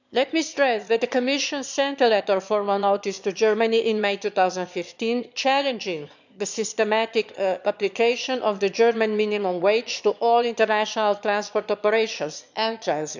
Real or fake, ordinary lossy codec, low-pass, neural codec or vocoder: fake; none; 7.2 kHz; autoencoder, 22.05 kHz, a latent of 192 numbers a frame, VITS, trained on one speaker